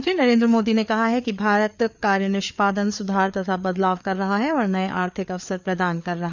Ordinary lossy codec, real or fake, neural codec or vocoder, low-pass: none; fake; codec, 16 kHz, 4 kbps, FreqCodec, larger model; 7.2 kHz